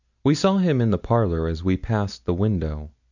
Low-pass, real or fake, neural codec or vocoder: 7.2 kHz; real; none